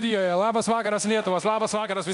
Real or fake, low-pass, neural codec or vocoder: fake; 10.8 kHz; codec, 24 kHz, 0.9 kbps, DualCodec